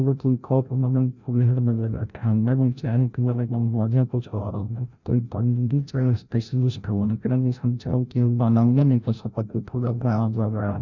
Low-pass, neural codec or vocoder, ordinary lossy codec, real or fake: 7.2 kHz; codec, 16 kHz, 0.5 kbps, FreqCodec, larger model; none; fake